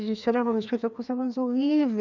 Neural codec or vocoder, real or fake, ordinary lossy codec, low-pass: codec, 16 kHz, 2 kbps, FreqCodec, larger model; fake; none; 7.2 kHz